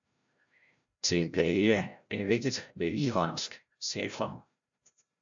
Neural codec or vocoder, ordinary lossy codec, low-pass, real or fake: codec, 16 kHz, 0.5 kbps, FreqCodec, larger model; AAC, 64 kbps; 7.2 kHz; fake